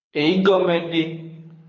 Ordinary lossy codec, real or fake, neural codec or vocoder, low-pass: AAC, 32 kbps; fake; codec, 24 kHz, 6 kbps, HILCodec; 7.2 kHz